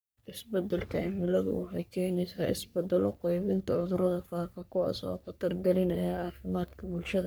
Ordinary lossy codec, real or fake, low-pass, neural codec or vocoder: none; fake; none; codec, 44.1 kHz, 3.4 kbps, Pupu-Codec